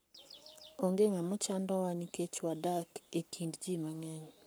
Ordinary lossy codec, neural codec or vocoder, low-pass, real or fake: none; codec, 44.1 kHz, 7.8 kbps, Pupu-Codec; none; fake